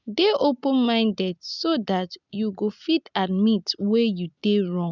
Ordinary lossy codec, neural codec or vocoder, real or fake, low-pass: none; none; real; 7.2 kHz